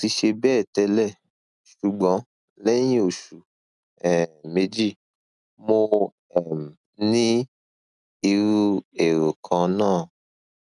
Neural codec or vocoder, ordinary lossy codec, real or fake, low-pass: none; none; real; 10.8 kHz